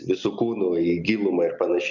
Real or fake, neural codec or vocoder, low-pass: real; none; 7.2 kHz